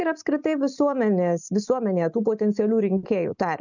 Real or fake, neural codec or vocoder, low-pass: real; none; 7.2 kHz